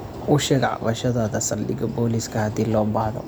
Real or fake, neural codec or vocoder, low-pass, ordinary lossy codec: real; none; none; none